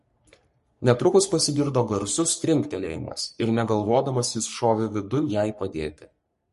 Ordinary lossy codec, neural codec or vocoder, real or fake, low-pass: MP3, 48 kbps; codec, 44.1 kHz, 3.4 kbps, Pupu-Codec; fake; 14.4 kHz